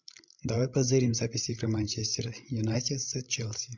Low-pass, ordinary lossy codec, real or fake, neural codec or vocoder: 7.2 kHz; MP3, 64 kbps; fake; codec, 16 kHz, 16 kbps, FreqCodec, larger model